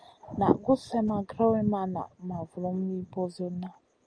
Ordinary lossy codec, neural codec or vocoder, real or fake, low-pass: Opus, 32 kbps; none; real; 9.9 kHz